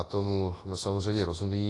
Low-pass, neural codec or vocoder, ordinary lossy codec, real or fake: 10.8 kHz; codec, 24 kHz, 0.9 kbps, WavTokenizer, large speech release; AAC, 32 kbps; fake